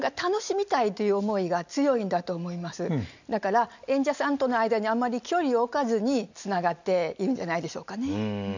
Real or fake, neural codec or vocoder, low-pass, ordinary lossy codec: real; none; 7.2 kHz; none